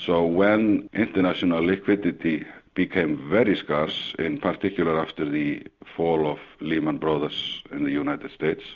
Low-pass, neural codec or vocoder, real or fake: 7.2 kHz; none; real